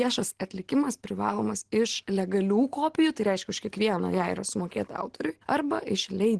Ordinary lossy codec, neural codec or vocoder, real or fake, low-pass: Opus, 16 kbps; none; real; 10.8 kHz